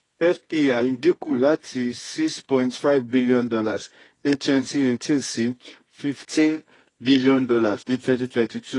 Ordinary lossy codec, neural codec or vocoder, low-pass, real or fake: AAC, 32 kbps; codec, 24 kHz, 0.9 kbps, WavTokenizer, medium music audio release; 10.8 kHz; fake